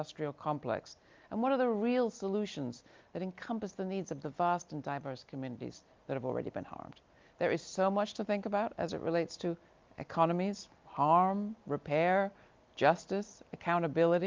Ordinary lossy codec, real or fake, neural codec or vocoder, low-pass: Opus, 32 kbps; real; none; 7.2 kHz